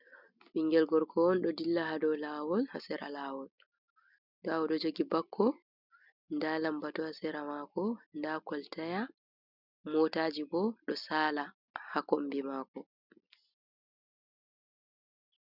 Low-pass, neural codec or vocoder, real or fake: 5.4 kHz; none; real